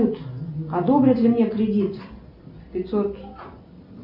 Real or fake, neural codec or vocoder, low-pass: real; none; 5.4 kHz